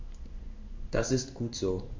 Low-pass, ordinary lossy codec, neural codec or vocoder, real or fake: 7.2 kHz; MP3, 48 kbps; codec, 16 kHz in and 24 kHz out, 1 kbps, XY-Tokenizer; fake